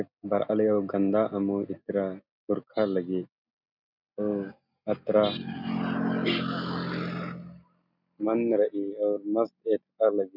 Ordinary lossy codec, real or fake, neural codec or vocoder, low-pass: none; real; none; 5.4 kHz